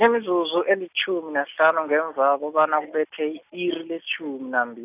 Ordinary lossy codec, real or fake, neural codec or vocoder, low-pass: none; real; none; 3.6 kHz